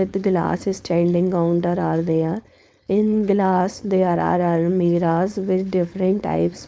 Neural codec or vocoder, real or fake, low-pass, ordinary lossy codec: codec, 16 kHz, 4.8 kbps, FACodec; fake; none; none